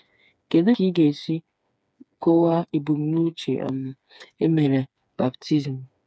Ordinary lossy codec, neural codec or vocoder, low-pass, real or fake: none; codec, 16 kHz, 4 kbps, FreqCodec, smaller model; none; fake